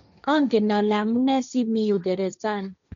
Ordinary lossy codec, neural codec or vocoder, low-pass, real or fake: none; codec, 16 kHz, 1.1 kbps, Voila-Tokenizer; 7.2 kHz; fake